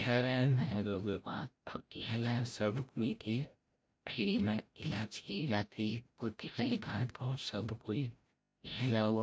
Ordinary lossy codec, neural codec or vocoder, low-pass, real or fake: none; codec, 16 kHz, 0.5 kbps, FreqCodec, larger model; none; fake